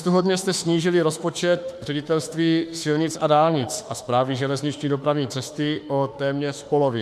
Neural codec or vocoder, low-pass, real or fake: autoencoder, 48 kHz, 32 numbers a frame, DAC-VAE, trained on Japanese speech; 14.4 kHz; fake